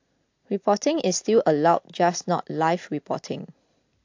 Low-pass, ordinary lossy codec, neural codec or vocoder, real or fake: 7.2 kHz; AAC, 48 kbps; none; real